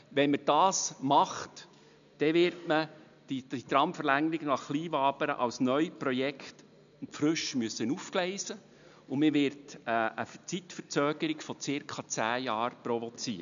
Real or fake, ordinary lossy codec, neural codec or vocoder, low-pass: real; none; none; 7.2 kHz